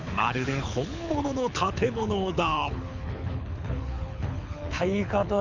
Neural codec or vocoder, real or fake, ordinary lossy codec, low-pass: codec, 24 kHz, 6 kbps, HILCodec; fake; none; 7.2 kHz